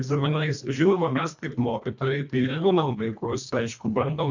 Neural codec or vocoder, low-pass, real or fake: codec, 24 kHz, 1.5 kbps, HILCodec; 7.2 kHz; fake